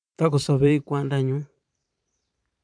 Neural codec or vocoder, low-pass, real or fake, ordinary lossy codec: vocoder, 44.1 kHz, 128 mel bands every 512 samples, BigVGAN v2; 9.9 kHz; fake; none